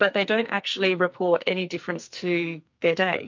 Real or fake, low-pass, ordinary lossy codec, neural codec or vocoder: fake; 7.2 kHz; MP3, 48 kbps; codec, 44.1 kHz, 2.6 kbps, SNAC